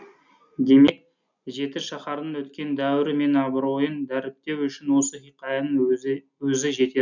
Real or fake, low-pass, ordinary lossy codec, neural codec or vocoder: real; 7.2 kHz; none; none